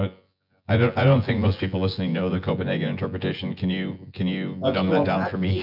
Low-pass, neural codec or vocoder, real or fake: 5.4 kHz; vocoder, 24 kHz, 100 mel bands, Vocos; fake